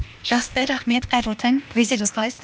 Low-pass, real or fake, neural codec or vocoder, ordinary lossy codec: none; fake; codec, 16 kHz, 0.8 kbps, ZipCodec; none